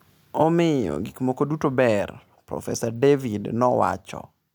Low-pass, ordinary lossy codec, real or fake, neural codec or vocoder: none; none; real; none